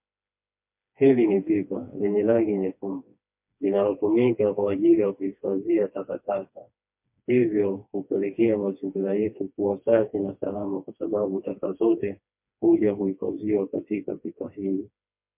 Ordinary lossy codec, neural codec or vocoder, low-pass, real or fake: MP3, 32 kbps; codec, 16 kHz, 2 kbps, FreqCodec, smaller model; 3.6 kHz; fake